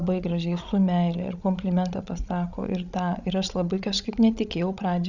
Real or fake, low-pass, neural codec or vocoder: fake; 7.2 kHz; codec, 16 kHz, 16 kbps, FreqCodec, larger model